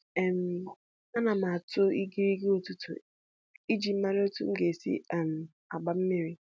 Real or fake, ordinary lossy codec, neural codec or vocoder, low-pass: real; none; none; none